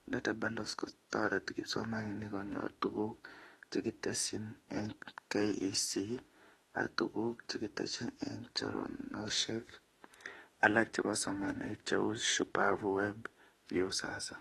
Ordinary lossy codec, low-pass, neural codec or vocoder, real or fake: AAC, 32 kbps; 19.8 kHz; autoencoder, 48 kHz, 32 numbers a frame, DAC-VAE, trained on Japanese speech; fake